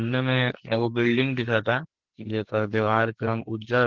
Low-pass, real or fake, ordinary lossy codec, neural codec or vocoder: 7.2 kHz; fake; Opus, 16 kbps; codec, 44.1 kHz, 2.6 kbps, SNAC